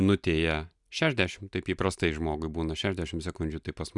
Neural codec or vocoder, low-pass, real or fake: none; 10.8 kHz; real